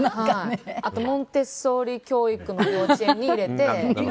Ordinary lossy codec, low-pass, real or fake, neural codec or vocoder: none; none; real; none